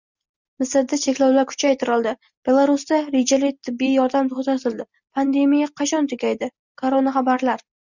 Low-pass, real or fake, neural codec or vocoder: 7.2 kHz; real; none